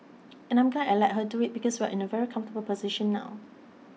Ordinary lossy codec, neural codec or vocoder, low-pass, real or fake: none; none; none; real